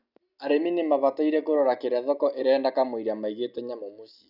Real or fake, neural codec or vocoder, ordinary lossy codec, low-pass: real; none; none; 5.4 kHz